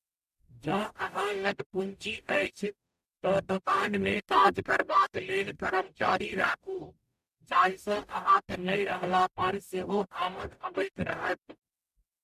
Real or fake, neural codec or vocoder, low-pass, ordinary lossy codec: fake; codec, 44.1 kHz, 0.9 kbps, DAC; 14.4 kHz; MP3, 96 kbps